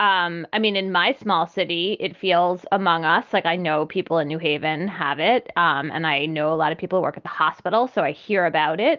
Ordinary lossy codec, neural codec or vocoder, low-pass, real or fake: Opus, 32 kbps; none; 7.2 kHz; real